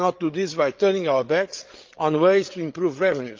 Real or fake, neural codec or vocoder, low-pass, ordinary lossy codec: fake; codec, 16 kHz, 8 kbps, FreqCodec, larger model; 7.2 kHz; Opus, 32 kbps